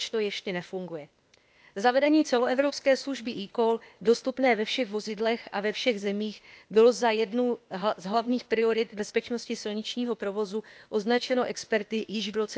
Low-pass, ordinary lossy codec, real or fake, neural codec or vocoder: none; none; fake; codec, 16 kHz, 0.8 kbps, ZipCodec